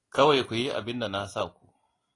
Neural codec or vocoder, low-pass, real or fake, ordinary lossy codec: none; 10.8 kHz; real; AAC, 32 kbps